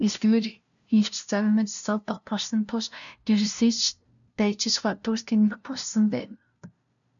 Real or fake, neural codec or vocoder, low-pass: fake; codec, 16 kHz, 0.5 kbps, FunCodec, trained on LibriTTS, 25 frames a second; 7.2 kHz